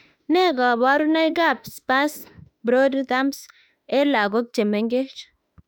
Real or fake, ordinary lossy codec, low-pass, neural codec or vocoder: fake; none; 19.8 kHz; autoencoder, 48 kHz, 32 numbers a frame, DAC-VAE, trained on Japanese speech